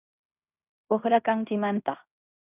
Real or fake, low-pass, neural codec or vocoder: fake; 3.6 kHz; codec, 16 kHz in and 24 kHz out, 0.9 kbps, LongCat-Audio-Codec, fine tuned four codebook decoder